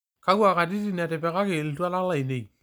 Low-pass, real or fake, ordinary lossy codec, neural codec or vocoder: none; real; none; none